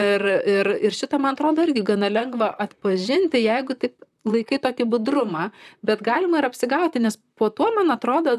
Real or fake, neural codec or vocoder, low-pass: fake; vocoder, 44.1 kHz, 128 mel bands, Pupu-Vocoder; 14.4 kHz